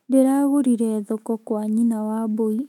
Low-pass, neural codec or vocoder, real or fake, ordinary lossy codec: 19.8 kHz; autoencoder, 48 kHz, 128 numbers a frame, DAC-VAE, trained on Japanese speech; fake; none